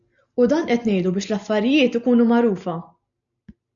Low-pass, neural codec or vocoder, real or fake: 7.2 kHz; none; real